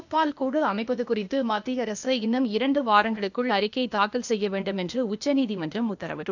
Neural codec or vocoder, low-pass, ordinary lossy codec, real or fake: codec, 16 kHz, 0.8 kbps, ZipCodec; 7.2 kHz; none; fake